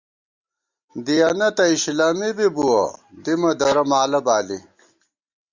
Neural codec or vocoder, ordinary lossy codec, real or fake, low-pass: none; Opus, 64 kbps; real; 7.2 kHz